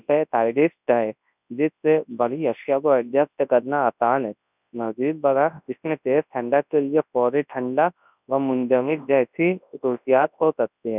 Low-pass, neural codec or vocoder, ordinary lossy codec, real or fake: 3.6 kHz; codec, 24 kHz, 0.9 kbps, WavTokenizer, large speech release; none; fake